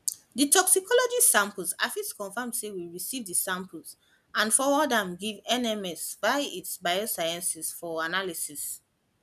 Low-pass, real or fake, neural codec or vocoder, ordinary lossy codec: 14.4 kHz; real; none; none